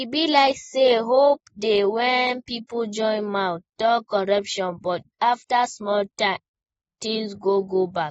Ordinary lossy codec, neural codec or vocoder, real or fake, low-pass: AAC, 24 kbps; none; real; 19.8 kHz